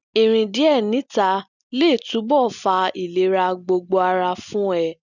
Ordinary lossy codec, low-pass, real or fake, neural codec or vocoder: none; 7.2 kHz; real; none